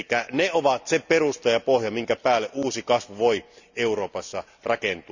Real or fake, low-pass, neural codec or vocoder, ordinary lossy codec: real; 7.2 kHz; none; none